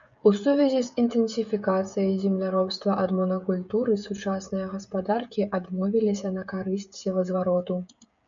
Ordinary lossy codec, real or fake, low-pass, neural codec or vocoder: MP3, 96 kbps; fake; 7.2 kHz; codec, 16 kHz, 16 kbps, FreqCodec, smaller model